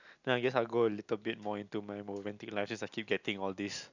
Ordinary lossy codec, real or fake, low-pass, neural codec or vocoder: none; real; 7.2 kHz; none